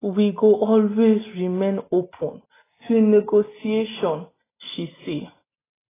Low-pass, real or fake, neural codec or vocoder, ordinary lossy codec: 3.6 kHz; real; none; AAC, 16 kbps